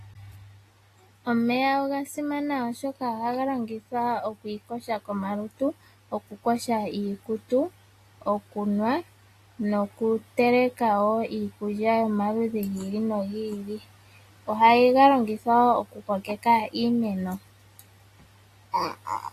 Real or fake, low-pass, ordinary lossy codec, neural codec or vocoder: real; 14.4 kHz; AAC, 48 kbps; none